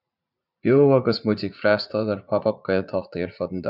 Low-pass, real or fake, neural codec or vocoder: 5.4 kHz; fake; vocoder, 44.1 kHz, 80 mel bands, Vocos